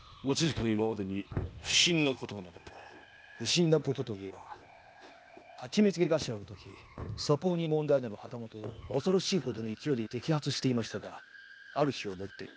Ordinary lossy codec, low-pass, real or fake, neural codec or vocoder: none; none; fake; codec, 16 kHz, 0.8 kbps, ZipCodec